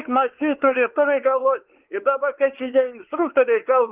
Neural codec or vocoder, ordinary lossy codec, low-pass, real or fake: codec, 16 kHz, 4 kbps, X-Codec, WavLM features, trained on Multilingual LibriSpeech; Opus, 16 kbps; 3.6 kHz; fake